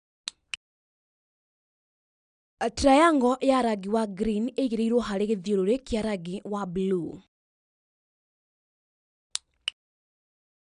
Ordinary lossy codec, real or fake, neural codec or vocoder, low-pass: none; real; none; 9.9 kHz